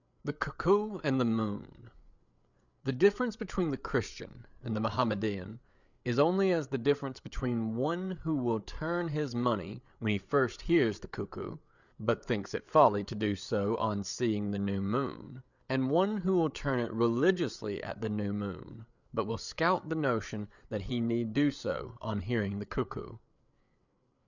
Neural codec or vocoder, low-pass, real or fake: codec, 16 kHz, 8 kbps, FreqCodec, larger model; 7.2 kHz; fake